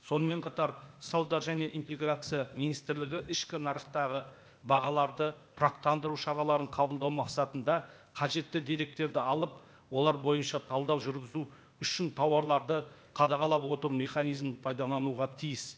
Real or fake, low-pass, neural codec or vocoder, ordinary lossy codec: fake; none; codec, 16 kHz, 0.8 kbps, ZipCodec; none